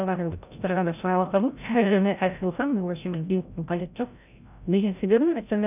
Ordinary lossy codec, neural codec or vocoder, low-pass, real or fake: none; codec, 16 kHz, 0.5 kbps, FreqCodec, larger model; 3.6 kHz; fake